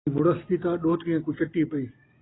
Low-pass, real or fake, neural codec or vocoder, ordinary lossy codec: 7.2 kHz; real; none; AAC, 16 kbps